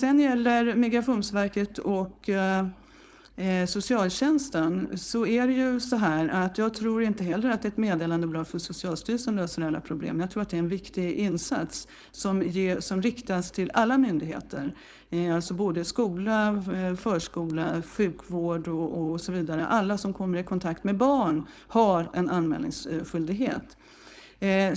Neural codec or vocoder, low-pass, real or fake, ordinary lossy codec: codec, 16 kHz, 4.8 kbps, FACodec; none; fake; none